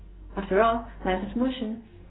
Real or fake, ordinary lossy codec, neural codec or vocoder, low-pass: fake; AAC, 16 kbps; codec, 44.1 kHz, 2.6 kbps, SNAC; 7.2 kHz